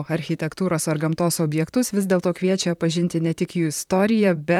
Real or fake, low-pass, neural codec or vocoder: fake; 19.8 kHz; vocoder, 44.1 kHz, 128 mel bands, Pupu-Vocoder